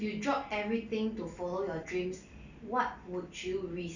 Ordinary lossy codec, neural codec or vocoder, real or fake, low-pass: none; none; real; 7.2 kHz